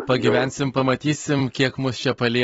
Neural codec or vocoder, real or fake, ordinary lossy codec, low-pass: vocoder, 44.1 kHz, 128 mel bands every 256 samples, BigVGAN v2; fake; AAC, 24 kbps; 19.8 kHz